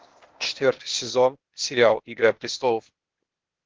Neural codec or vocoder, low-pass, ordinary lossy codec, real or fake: codec, 16 kHz, 0.8 kbps, ZipCodec; 7.2 kHz; Opus, 16 kbps; fake